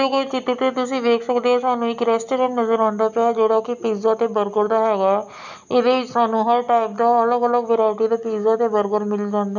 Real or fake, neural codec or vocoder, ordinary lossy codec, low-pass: real; none; none; 7.2 kHz